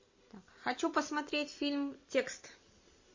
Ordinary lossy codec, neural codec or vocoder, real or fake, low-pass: MP3, 32 kbps; none; real; 7.2 kHz